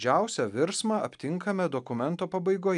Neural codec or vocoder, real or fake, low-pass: none; real; 10.8 kHz